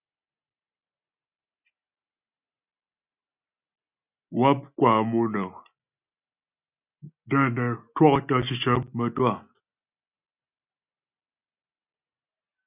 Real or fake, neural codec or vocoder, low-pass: real; none; 3.6 kHz